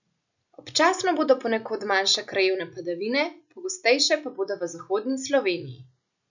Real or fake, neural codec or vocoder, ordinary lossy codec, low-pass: real; none; none; 7.2 kHz